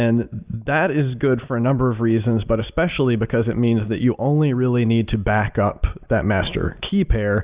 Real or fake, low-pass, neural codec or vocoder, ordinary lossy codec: real; 3.6 kHz; none; Opus, 64 kbps